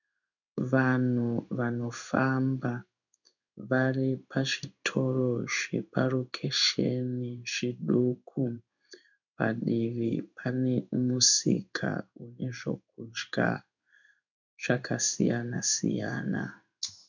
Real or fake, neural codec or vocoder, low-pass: fake; codec, 16 kHz in and 24 kHz out, 1 kbps, XY-Tokenizer; 7.2 kHz